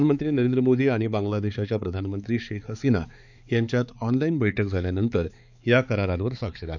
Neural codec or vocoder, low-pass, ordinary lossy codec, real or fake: codec, 16 kHz, 4 kbps, X-Codec, HuBERT features, trained on balanced general audio; 7.2 kHz; none; fake